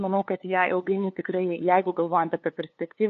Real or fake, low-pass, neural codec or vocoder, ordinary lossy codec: fake; 7.2 kHz; codec, 16 kHz, 2 kbps, FunCodec, trained on LibriTTS, 25 frames a second; AAC, 48 kbps